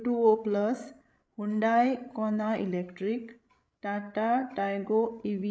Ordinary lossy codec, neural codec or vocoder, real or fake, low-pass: none; codec, 16 kHz, 16 kbps, FreqCodec, larger model; fake; none